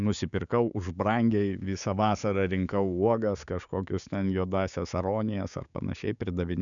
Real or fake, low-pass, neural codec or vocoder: fake; 7.2 kHz; codec, 16 kHz, 6 kbps, DAC